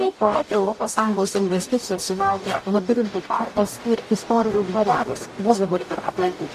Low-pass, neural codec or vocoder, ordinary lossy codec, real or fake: 14.4 kHz; codec, 44.1 kHz, 0.9 kbps, DAC; AAC, 64 kbps; fake